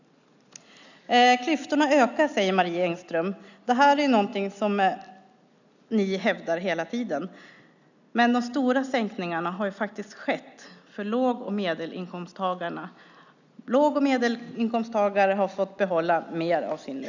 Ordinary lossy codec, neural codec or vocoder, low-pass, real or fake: none; none; 7.2 kHz; real